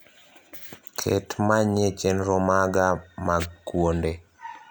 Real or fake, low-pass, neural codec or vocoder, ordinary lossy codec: real; none; none; none